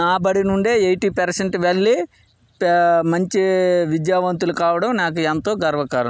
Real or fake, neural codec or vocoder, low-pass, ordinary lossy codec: real; none; none; none